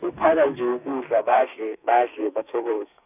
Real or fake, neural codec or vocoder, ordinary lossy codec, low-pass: fake; codec, 32 kHz, 1.9 kbps, SNAC; none; 3.6 kHz